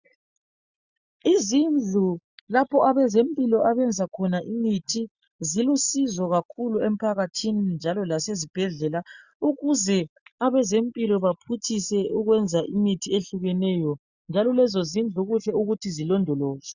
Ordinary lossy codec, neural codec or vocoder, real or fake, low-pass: Opus, 64 kbps; none; real; 7.2 kHz